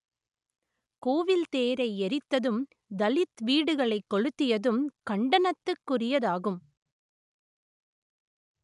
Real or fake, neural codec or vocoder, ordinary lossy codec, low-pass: real; none; none; 10.8 kHz